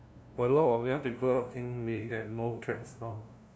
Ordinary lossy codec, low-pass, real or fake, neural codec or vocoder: none; none; fake; codec, 16 kHz, 0.5 kbps, FunCodec, trained on LibriTTS, 25 frames a second